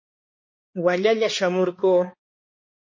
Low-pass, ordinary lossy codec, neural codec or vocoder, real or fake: 7.2 kHz; MP3, 32 kbps; codec, 16 kHz, 2 kbps, X-Codec, HuBERT features, trained on balanced general audio; fake